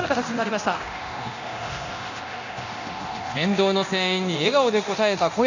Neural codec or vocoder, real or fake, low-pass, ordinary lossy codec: codec, 24 kHz, 0.9 kbps, DualCodec; fake; 7.2 kHz; none